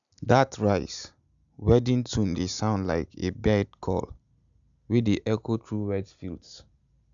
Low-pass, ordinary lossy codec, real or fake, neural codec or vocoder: 7.2 kHz; none; real; none